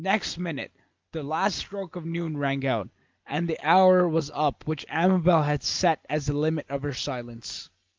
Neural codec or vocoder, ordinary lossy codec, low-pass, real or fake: none; Opus, 32 kbps; 7.2 kHz; real